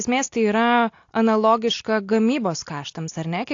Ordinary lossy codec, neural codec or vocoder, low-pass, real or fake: AAC, 48 kbps; none; 7.2 kHz; real